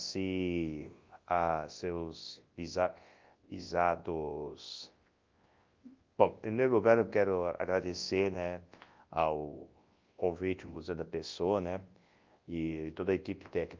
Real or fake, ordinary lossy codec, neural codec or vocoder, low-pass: fake; Opus, 32 kbps; codec, 24 kHz, 0.9 kbps, WavTokenizer, large speech release; 7.2 kHz